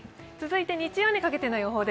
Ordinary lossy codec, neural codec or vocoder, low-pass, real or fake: none; none; none; real